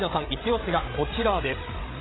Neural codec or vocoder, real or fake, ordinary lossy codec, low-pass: codec, 16 kHz, 16 kbps, FreqCodec, larger model; fake; AAC, 16 kbps; 7.2 kHz